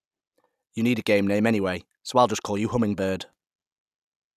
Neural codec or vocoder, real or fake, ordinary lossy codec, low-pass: none; real; none; 14.4 kHz